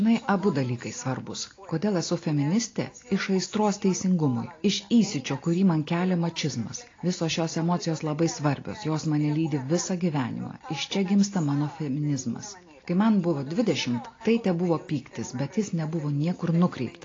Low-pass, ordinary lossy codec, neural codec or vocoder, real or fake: 7.2 kHz; AAC, 32 kbps; none; real